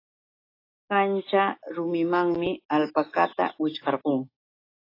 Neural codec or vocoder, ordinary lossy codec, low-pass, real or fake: none; AAC, 32 kbps; 5.4 kHz; real